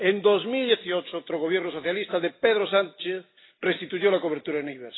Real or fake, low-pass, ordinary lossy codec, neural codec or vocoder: real; 7.2 kHz; AAC, 16 kbps; none